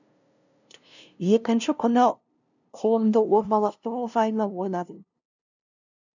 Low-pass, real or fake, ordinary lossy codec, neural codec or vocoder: 7.2 kHz; fake; none; codec, 16 kHz, 0.5 kbps, FunCodec, trained on LibriTTS, 25 frames a second